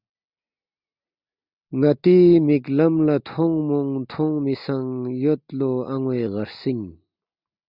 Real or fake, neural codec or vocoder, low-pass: real; none; 5.4 kHz